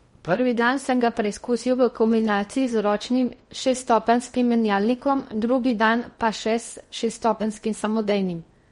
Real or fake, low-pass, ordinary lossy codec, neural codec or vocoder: fake; 10.8 kHz; MP3, 48 kbps; codec, 16 kHz in and 24 kHz out, 0.8 kbps, FocalCodec, streaming, 65536 codes